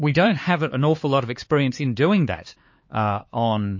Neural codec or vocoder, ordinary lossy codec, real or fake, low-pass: codec, 16 kHz, 4 kbps, X-Codec, HuBERT features, trained on LibriSpeech; MP3, 32 kbps; fake; 7.2 kHz